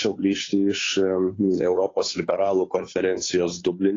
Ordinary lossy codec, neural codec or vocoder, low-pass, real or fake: AAC, 32 kbps; codec, 16 kHz, 2 kbps, FunCodec, trained on Chinese and English, 25 frames a second; 7.2 kHz; fake